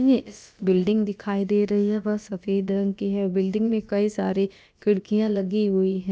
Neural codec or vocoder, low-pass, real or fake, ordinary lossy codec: codec, 16 kHz, about 1 kbps, DyCAST, with the encoder's durations; none; fake; none